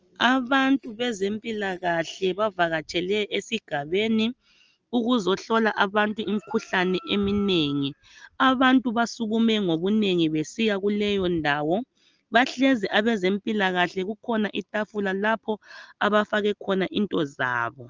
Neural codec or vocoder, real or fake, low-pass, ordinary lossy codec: none; real; 7.2 kHz; Opus, 24 kbps